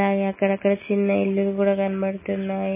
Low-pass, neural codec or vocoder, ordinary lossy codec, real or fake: 3.6 kHz; none; MP3, 16 kbps; real